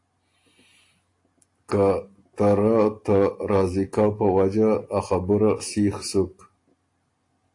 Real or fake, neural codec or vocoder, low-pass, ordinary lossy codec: real; none; 10.8 kHz; AAC, 48 kbps